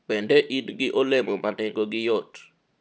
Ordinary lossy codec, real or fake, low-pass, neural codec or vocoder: none; real; none; none